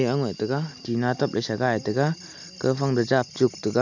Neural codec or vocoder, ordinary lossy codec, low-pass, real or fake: none; none; 7.2 kHz; real